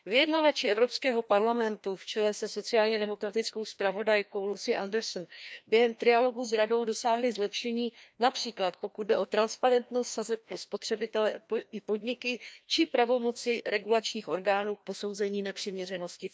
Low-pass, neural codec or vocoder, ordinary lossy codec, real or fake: none; codec, 16 kHz, 1 kbps, FreqCodec, larger model; none; fake